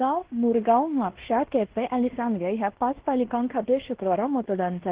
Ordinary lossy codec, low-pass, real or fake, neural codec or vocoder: Opus, 16 kbps; 3.6 kHz; fake; codec, 16 kHz in and 24 kHz out, 0.9 kbps, LongCat-Audio-Codec, fine tuned four codebook decoder